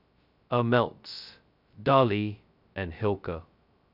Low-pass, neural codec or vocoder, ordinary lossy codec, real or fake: 5.4 kHz; codec, 16 kHz, 0.2 kbps, FocalCodec; none; fake